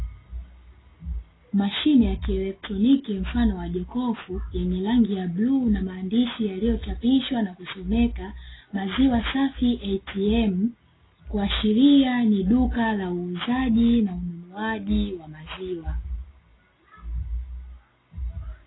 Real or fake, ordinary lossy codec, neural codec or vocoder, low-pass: real; AAC, 16 kbps; none; 7.2 kHz